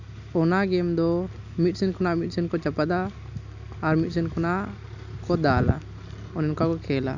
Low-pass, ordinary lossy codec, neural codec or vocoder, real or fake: 7.2 kHz; none; none; real